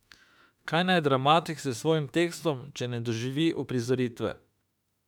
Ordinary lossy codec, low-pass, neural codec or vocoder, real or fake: none; 19.8 kHz; autoencoder, 48 kHz, 32 numbers a frame, DAC-VAE, trained on Japanese speech; fake